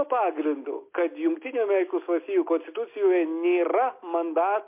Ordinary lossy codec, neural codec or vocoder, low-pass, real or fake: MP3, 24 kbps; none; 3.6 kHz; real